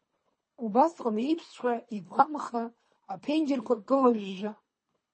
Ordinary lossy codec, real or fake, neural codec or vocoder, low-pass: MP3, 32 kbps; fake; codec, 24 kHz, 3 kbps, HILCodec; 9.9 kHz